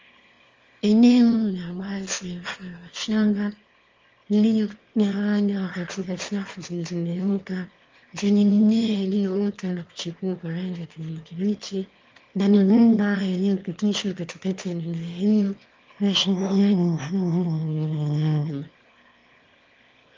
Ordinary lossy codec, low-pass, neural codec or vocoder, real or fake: Opus, 32 kbps; 7.2 kHz; autoencoder, 22.05 kHz, a latent of 192 numbers a frame, VITS, trained on one speaker; fake